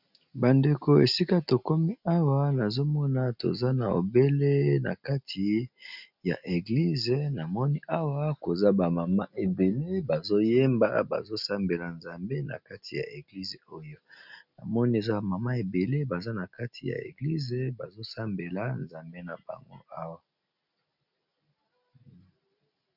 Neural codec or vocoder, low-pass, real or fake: none; 5.4 kHz; real